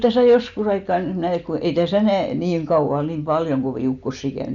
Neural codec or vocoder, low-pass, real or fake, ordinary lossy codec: none; 7.2 kHz; real; none